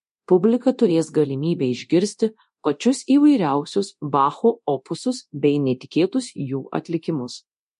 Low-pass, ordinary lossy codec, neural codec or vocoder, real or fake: 10.8 kHz; MP3, 48 kbps; codec, 24 kHz, 0.9 kbps, DualCodec; fake